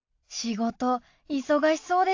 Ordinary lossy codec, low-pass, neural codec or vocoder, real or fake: AAC, 48 kbps; 7.2 kHz; none; real